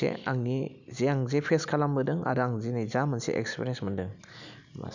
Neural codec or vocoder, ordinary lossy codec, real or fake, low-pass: none; none; real; 7.2 kHz